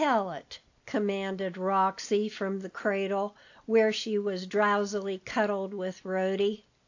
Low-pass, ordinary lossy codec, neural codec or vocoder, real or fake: 7.2 kHz; AAC, 48 kbps; none; real